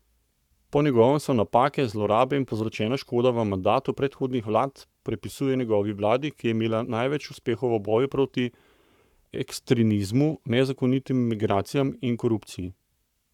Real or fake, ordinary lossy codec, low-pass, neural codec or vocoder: fake; none; 19.8 kHz; codec, 44.1 kHz, 7.8 kbps, Pupu-Codec